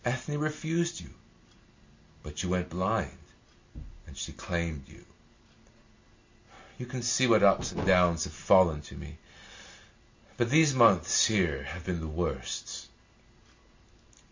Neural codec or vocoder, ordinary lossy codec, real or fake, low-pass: none; MP3, 48 kbps; real; 7.2 kHz